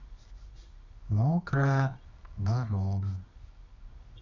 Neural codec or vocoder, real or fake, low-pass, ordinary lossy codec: codec, 24 kHz, 0.9 kbps, WavTokenizer, medium music audio release; fake; 7.2 kHz; none